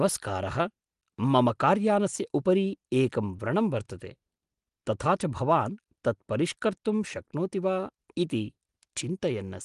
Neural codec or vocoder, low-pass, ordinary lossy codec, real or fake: none; 10.8 kHz; Opus, 24 kbps; real